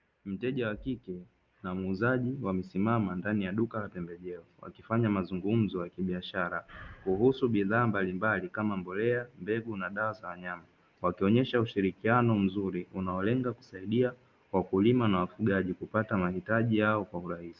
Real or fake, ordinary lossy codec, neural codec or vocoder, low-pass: real; Opus, 24 kbps; none; 7.2 kHz